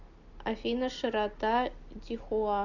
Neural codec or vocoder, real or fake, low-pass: none; real; 7.2 kHz